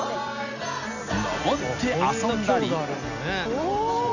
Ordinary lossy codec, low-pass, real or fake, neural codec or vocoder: none; 7.2 kHz; real; none